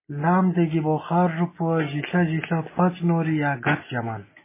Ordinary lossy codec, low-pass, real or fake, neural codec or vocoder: MP3, 16 kbps; 3.6 kHz; real; none